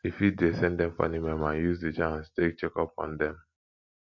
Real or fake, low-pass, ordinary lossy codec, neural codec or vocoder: real; 7.2 kHz; MP3, 48 kbps; none